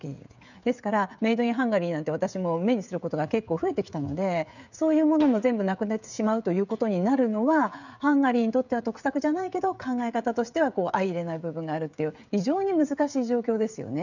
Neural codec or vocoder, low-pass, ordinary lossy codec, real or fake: codec, 16 kHz, 16 kbps, FreqCodec, smaller model; 7.2 kHz; none; fake